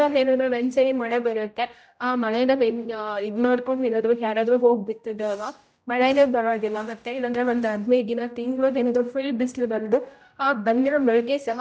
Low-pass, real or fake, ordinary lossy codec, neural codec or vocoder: none; fake; none; codec, 16 kHz, 0.5 kbps, X-Codec, HuBERT features, trained on general audio